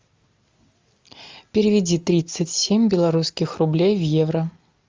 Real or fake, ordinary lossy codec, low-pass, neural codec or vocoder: real; Opus, 32 kbps; 7.2 kHz; none